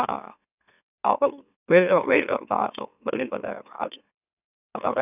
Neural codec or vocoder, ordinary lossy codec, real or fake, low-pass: autoencoder, 44.1 kHz, a latent of 192 numbers a frame, MeloTTS; none; fake; 3.6 kHz